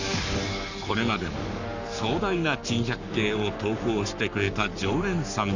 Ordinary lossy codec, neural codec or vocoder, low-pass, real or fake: none; codec, 44.1 kHz, 7.8 kbps, Pupu-Codec; 7.2 kHz; fake